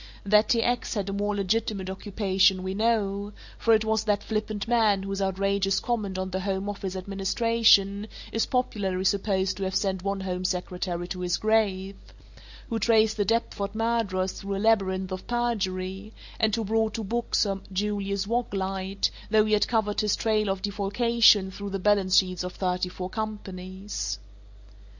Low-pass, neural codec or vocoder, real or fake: 7.2 kHz; none; real